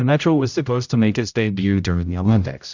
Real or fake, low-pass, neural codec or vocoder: fake; 7.2 kHz; codec, 16 kHz, 0.5 kbps, X-Codec, HuBERT features, trained on general audio